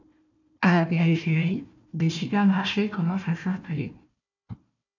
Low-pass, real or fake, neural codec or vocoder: 7.2 kHz; fake; codec, 16 kHz, 1 kbps, FunCodec, trained on Chinese and English, 50 frames a second